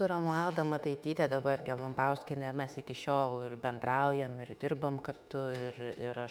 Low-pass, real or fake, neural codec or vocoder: 19.8 kHz; fake; autoencoder, 48 kHz, 32 numbers a frame, DAC-VAE, trained on Japanese speech